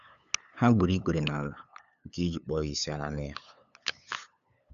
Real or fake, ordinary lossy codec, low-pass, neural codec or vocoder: fake; none; 7.2 kHz; codec, 16 kHz, 8 kbps, FunCodec, trained on LibriTTS, 25 frames a second